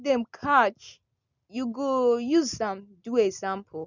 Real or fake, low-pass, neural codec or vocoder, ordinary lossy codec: real; 7.2 kHz; none; none